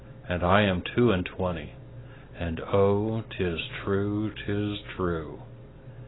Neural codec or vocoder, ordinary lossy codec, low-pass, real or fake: none; AAC, 16 kbps; 7.2 kHz; real